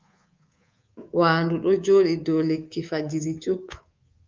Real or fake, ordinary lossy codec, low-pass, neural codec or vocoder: fake; Opus, 32 kbps; 7.2 kHz; codec, 24 kHz, 3.1 kbps, DualCodec